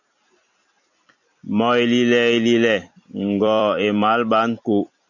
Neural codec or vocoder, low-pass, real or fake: vocoder, 44.1 kHz, 128 mel bands every 256 samples, BigVGAN v2; 7.2 kHz; fake